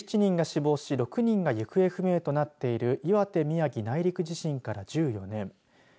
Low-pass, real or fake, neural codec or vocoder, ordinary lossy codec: none; real; none; none